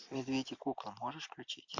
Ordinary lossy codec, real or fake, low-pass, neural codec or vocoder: MP3, 48 kbps; real; 7.2 kHz; none